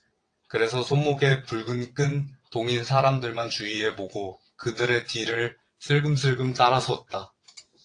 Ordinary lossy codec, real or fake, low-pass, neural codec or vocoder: AAC, 48 kbps; fake; 9.9 kHz; vocoder, 22.05 kHz, 80 mel bands, WaveNeXt